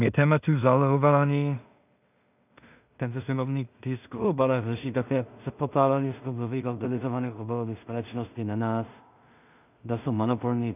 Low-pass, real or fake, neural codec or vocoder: 3.6 kHz; fake; codec, 16 kHz in and 24 kHz out, 0.4 kbps, LongCat-Audio-Codec, two codebook decoder